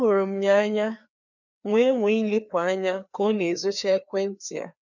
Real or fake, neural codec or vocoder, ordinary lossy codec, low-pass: fake; codec, 16 kHz, 2 kbps, FreqCodec, larger model; none; 7.2 kHz